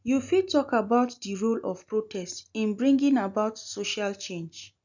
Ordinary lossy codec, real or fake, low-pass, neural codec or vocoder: none; real; 7.2 kHz; none